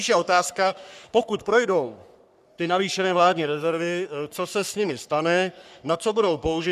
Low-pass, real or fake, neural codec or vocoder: 14.4 kHz; fake; codec, 44.1 kHz, 3.4 kbps, Pupu-Codec